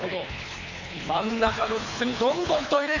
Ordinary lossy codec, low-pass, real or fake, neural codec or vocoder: none; 7.2 kHz; fake; codec, 24 kHz, 3 kbps, HILCodec